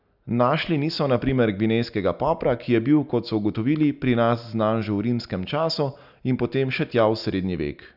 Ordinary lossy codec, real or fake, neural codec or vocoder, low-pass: none; real; none; 5.4 kHz